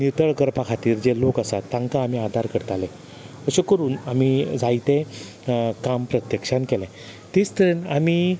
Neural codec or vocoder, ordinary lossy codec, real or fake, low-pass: none; none; real; none